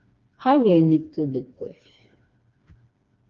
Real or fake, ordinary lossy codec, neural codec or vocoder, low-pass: fake; Opus, 32 kbps; codec, 16 kHz, 2 kbps, FreqCodec, smaller model; 7.2 kHz